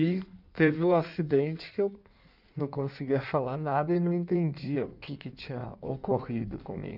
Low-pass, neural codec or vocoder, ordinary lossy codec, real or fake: 5.4 kHz; codec, 16 kHz in and 24 kHz out, 1.1 kbps, FireRedTTS-2 codec; none; fake